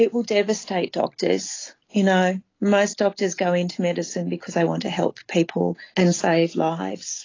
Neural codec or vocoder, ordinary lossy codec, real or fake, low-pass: none; AAC, 32 kbps; real; 7.2 kHz